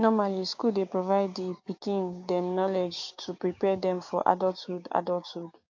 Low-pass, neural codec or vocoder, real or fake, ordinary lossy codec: 7.2 kHz; codec, 16 kHz, 6 kbps, DAC; fake; AAC, 48 kbps